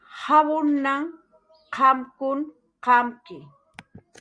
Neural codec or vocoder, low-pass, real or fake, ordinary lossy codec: none; 9.9 kHz; real; Opus, 64 kbps